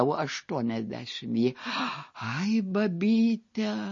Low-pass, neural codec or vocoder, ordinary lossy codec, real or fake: 7.2 kHz; none; MP3, 32 kbps; real